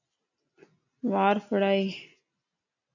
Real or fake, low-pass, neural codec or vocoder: real; 7.2 kHz; none